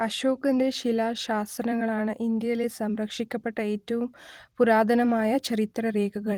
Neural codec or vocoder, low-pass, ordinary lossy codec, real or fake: vocoder, 44.1 kHz, 128 mel bands every 256 samples, BigVGAN v2; 19.8 kHz; Opus, 24 kbps; fake